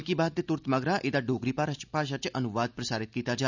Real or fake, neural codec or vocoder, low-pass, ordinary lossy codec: real; none; 7.2 kHz; none